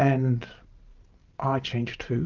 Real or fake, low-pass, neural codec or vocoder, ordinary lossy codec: real; 7.2 kHz; none; Opus, 24 kbps